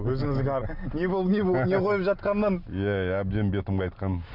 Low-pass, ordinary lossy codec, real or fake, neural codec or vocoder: 5.4 kHz; none; real; none